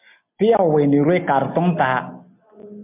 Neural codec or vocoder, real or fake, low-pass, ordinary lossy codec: none; real; 3.6 kHz; MP3, 32 kbps